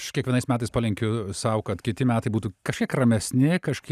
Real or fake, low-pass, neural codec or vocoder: real; 14.4 kHz; none